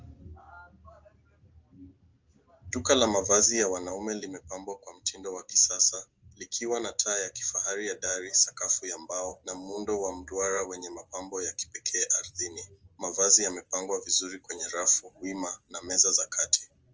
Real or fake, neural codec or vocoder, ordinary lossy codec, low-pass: real; none; Opus, 24 kbps; 7.2 kHz